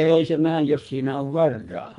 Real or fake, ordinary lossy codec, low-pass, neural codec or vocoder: fake; none; 10.8 kHz; codec, 24 kHz, 1.5 kbps, HILCodec